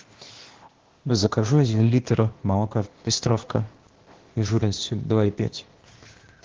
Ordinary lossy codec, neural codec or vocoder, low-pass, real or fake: Opus, 16 kbps; codec, 16 kHz, 0.8 kbps, ZipCodec; 7.2 kHz; fake